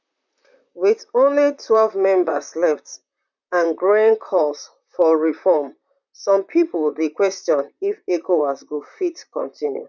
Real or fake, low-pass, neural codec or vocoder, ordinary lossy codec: fake; 7.2 kHz; vocoder, 44.1 kHz, 128 mel bands, Pupu-Vocoder; none